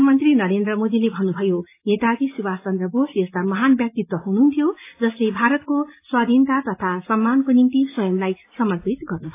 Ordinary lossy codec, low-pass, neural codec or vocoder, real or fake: AAC, 24 kbps; 3.6 kHz; none; real